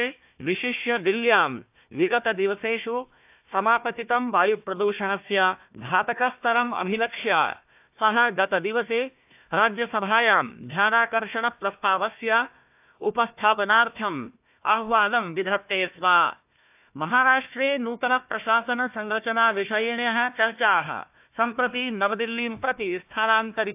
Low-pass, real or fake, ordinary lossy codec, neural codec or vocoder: 3.6 kHz; fake; AAC, 32 kbps; codec, 16 kHz, 1 kbps, FunCodec, trained on Chinese and English, 50 frames a second